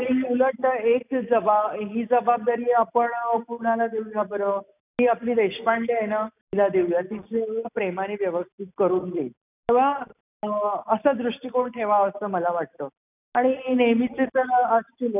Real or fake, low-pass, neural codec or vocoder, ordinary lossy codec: real; 3.6 kHz; none; none